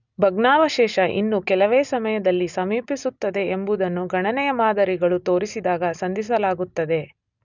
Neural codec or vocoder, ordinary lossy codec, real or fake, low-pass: none; none; real; 7.2 kHz